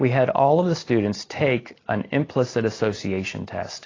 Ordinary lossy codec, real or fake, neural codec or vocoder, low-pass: AAC, 32 kbps; real; none; 7.2 kHz